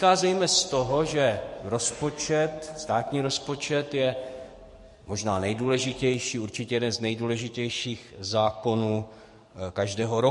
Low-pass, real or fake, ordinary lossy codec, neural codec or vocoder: 14.4 kHz; fake; MP3, 48 kbps; codec, 44.1 kHz, 7.8 kbps, DAC